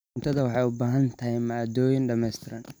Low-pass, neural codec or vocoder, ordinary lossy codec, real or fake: none; none; none; real